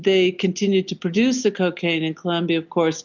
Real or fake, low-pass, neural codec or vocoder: real; 7.2 kHz; none